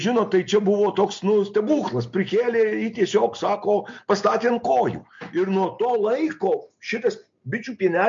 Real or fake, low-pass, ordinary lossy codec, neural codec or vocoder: real; 7.2 kHz; MP3, 48 kbps; none